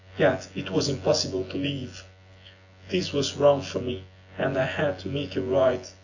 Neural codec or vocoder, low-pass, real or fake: vocoder, 24 kHz, 100 mel bands, Vocos; 7.2 kHz; fake